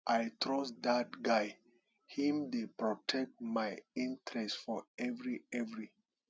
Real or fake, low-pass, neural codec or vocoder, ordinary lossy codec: real; none; none; none